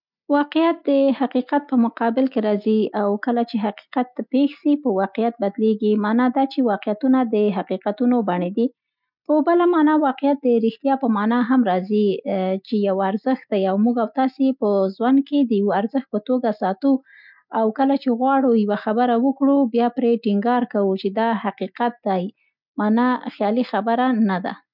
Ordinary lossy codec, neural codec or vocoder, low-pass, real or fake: none; none; 5.4 kHz; real